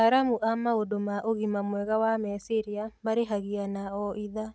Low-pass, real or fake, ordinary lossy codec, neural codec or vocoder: none; real; none; none